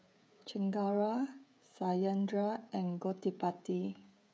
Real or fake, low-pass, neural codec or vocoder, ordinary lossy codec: fake; none; codec, 16 kHz, 16 kbps, FreqCodec, smaller model; none